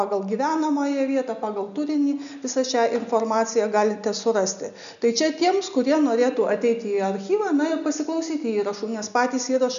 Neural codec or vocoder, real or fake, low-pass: none; real; 7.2 kHz